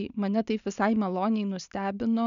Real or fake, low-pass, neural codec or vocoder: real; 7.2 kHz; none